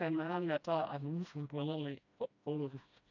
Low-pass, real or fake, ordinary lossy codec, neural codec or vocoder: 7.2 kHz; fake; none; codec, 16 kHz, 1 kbps, FreqCodec, smaller model